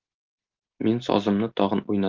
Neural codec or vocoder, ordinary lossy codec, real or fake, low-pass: none; Opus, 32 kbps; real; 7.2 kHz